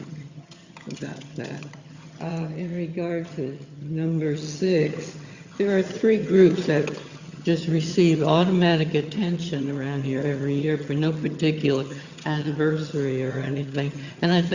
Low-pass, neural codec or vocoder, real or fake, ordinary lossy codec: 7.2 kHz; vocoder, 22.05 kHz, 80 mel bands, HiFi-GAN; fake; Opus, 64 kbps